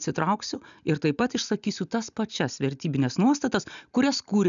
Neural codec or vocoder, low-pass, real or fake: none; 7.2 kHz; real